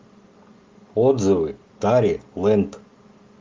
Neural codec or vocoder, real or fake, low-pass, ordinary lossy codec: none; real; 7.2 kHz; Opus, 24 kbps